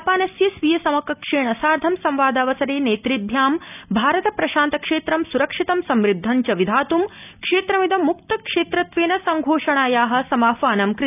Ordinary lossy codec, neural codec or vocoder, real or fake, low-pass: none; none; real; 3.6 kHz